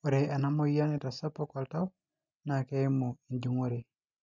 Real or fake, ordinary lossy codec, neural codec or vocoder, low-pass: real; none; none; 7.2 kHz